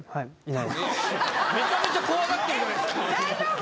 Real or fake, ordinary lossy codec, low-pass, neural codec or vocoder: real; none; none; none